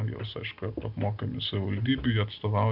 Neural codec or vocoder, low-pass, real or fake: none; 5.4 kHz; real